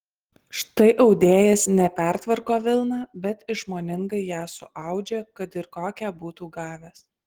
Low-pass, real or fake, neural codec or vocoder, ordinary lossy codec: 19.8 kHz; real; none; Opus, 16 kbps